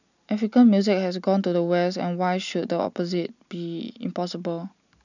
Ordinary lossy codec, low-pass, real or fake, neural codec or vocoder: none; 7.2 kHz; real; none